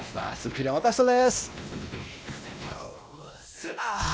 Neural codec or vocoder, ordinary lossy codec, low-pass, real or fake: codec, 16 kHz, 1 kbps, X-Codec, WavLM features, trained on Multilingual LibriSpeech; none; none; fake